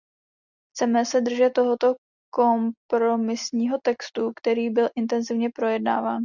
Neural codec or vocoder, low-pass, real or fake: none; 7.2 kHz; real